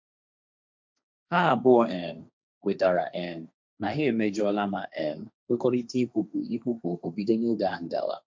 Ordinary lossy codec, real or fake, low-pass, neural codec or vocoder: none; fake; none; codec, 16 kHz, 1.1 kbps, Voila-Tokenizer